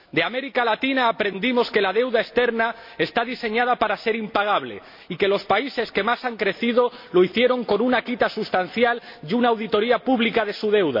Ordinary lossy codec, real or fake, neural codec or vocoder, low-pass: MP3, 32 kbps; real; none; 5.4 kHz